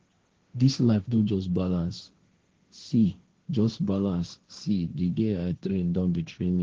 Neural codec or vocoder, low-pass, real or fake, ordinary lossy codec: codec, 16 kHz, 1.1 kbps, Voila-Tokenizer; 7.2 kHz; fake; Opus, 16 kbps